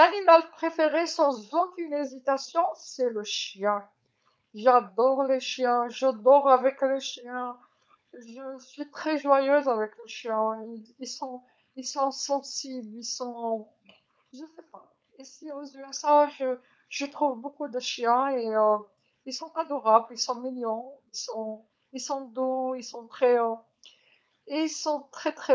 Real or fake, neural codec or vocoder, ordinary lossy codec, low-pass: fake; codec, 16 kHz, 4.8 kbps, FACodec; none; none